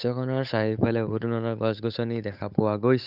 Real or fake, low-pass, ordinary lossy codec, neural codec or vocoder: fake; 5.4 kHz; none; codec, 16 kHz, 8 kbps, FunCodec, trained on Chinese and English, 25 frames a second